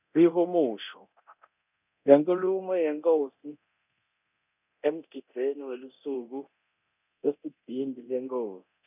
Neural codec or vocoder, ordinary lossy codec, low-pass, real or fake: codec, 24 kHz, 0.9 kbps, DualCodec; none; 3.6 kHz; fake